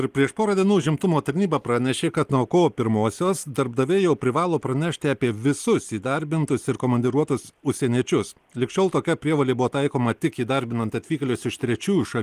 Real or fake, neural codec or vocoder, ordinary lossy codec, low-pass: real; none; Opus, 24 kbps; 14.4 kHz